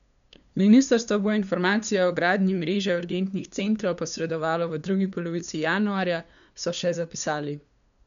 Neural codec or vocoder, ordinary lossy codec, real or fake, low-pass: codec, 16 kHz, 2 kbps, FunCodec, trained on LibriTTS, 25 frames a second; none; fake; 7.2 kHz